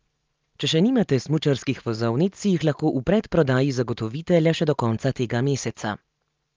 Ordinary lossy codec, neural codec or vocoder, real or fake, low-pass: Opus, 24 kbps; none; real; 7.2 kHz